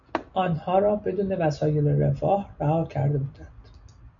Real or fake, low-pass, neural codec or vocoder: real; 7.2 kHz; none